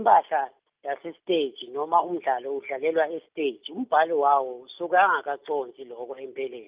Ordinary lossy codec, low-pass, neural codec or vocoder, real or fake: Opus, 32 kbps; 3.6 kHz; none; real